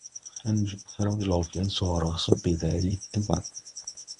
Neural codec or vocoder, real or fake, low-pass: codec, 24 kHz, 0.9 kbps, WavTokenizer, medium speech release version 1; fake; 10.8 kHz